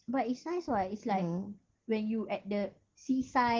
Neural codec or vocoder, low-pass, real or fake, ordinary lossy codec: none; 7.2 kHz; real; Opus, 16 kbps